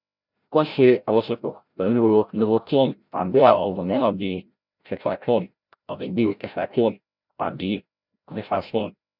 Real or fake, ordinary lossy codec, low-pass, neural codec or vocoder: fake; none; 5.4 kHz; codec, 16 kHz, 0.5 kbps, FreqCodec, larger model